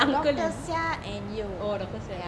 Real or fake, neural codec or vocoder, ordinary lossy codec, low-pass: real; none; none; none